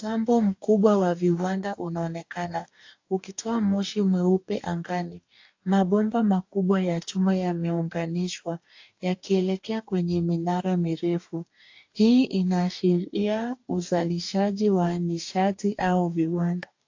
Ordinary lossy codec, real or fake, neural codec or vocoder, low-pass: AAC, 48 kbps; fake; codec, 44.1 kHz, 2.6 kbps, DAC; 7.2 kHz